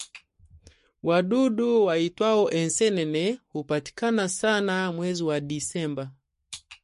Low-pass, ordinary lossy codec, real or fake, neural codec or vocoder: 14.4 kHz; MP3, 48 kbps; fake; codec, 44.1 kHz, 7.8 kbps, Pupu-Codec